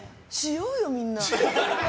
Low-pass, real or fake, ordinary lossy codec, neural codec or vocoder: none; real; none; none